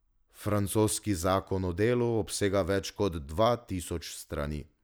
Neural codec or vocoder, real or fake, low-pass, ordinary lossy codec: none; real; none; none